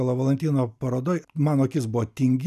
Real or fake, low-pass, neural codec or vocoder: real; 14.4 kHz; none